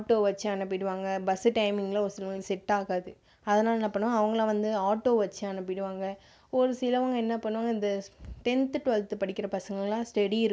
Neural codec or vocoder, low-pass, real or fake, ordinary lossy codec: none; none; real; none